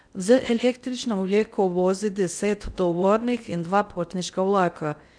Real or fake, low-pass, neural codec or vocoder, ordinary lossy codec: fake; 9.9 kHz; codec, 16 kHz in and 24 kHz out, 0.6 kbps, FocalCodec, streaming, 2048 codes; none